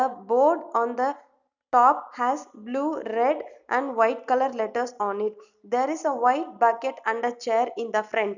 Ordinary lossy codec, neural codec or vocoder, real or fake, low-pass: none; none; real; 7.2 kHz